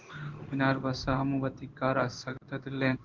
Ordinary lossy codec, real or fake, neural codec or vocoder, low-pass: Opus, 16 kbps; fake; codec, 16 kHz in and 24 kHz out, 1 kbps, XY-Tokenizer; 7.2 kHz